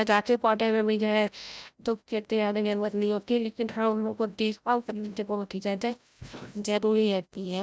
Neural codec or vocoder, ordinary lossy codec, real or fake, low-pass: codec, 16 kHz, 0.5 kbps, FreqCodec, larger model; none; fake; none